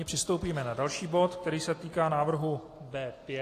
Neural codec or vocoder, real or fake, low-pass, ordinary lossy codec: none; real; 14.4 kHz; AAC, 48 kbps